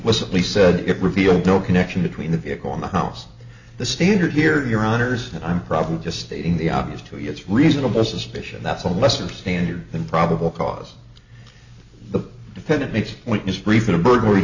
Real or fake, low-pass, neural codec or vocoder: real; 7.2 kHz; none